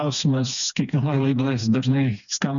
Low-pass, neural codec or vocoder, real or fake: 7.2 kHz; codec, 16 kHz, 2 kbps, FreqCodec, smaller model; fake